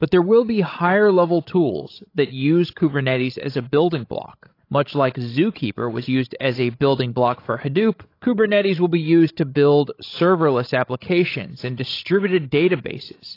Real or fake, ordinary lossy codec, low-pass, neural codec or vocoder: fake; AAC, 32 kbps; 5.4 kHz; codec, 16 kHz, 16 kbps, FreqCodec, larger model